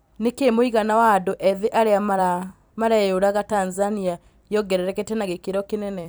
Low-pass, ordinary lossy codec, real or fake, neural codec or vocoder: none; none; fake; vocoder, 44.1 kHz, 128 mel bands every 256 samples, BigVGAN v2